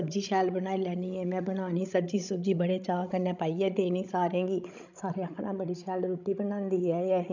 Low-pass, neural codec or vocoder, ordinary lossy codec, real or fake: 7.2 kHz; codec, 16 kHz, 16 kbps, FreqCodec, larger model; none; fake